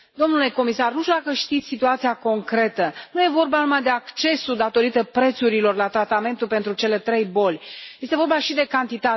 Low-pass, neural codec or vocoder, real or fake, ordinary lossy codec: 7.2 kHz; none; real; MP3, 24 kbps